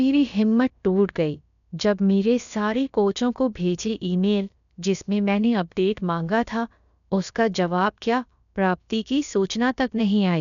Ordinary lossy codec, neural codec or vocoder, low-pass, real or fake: none; codec, 16 kHz, about 1 kbps, DyCAST, with the encoder's durations; 7.2 kHz; fake